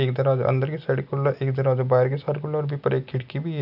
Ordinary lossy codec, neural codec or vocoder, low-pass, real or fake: none; none; 5.4 kHz; real